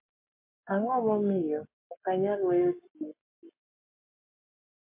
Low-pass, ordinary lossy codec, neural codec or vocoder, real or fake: 3.6 kHz; MP3, 24 kbps; codec, 44.1 kHz, 7.8 kbps, Pupu-Codec; fake